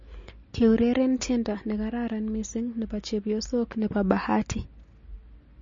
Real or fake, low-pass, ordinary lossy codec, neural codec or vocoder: real; 7.2 kHz; MP3, 32 kbps; none